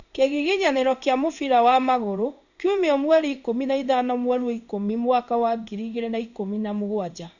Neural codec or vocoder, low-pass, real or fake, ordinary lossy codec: codec, 16 kHz in and 24 kHz out, 1 kbps, XY-Tokenizer; 7.2 kHz; fake; none